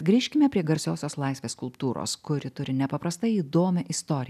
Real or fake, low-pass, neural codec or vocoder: real; 14.4 kHz; none